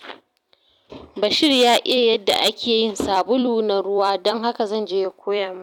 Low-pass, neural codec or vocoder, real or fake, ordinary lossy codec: 19.8 kHz; vocoder, 44.1 kHz, 128 mel bands every 256 samples, BigVGAN v2; fake; none